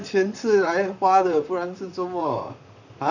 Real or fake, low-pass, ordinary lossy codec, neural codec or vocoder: fake; 7.2 kHz; none; vocoder, 44.1 kHz, 128 mel bands, Pupu-Vocoder